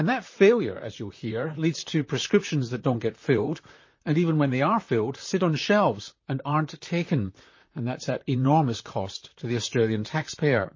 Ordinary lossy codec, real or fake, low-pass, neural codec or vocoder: MP3, 32 kbps; fake; 7.2 kHz; vocoder, 44.1 kHz, 128 mel bands, Pupu-Vocoder